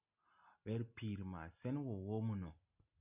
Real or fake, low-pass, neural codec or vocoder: real; 3.6 kHz; none